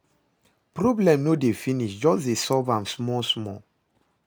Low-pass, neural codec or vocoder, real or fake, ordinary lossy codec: none; none; real; none